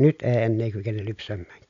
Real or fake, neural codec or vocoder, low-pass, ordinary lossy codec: real; none; 7.2 kHz; none